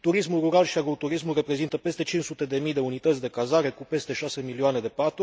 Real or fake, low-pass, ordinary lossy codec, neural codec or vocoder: real; none; none; none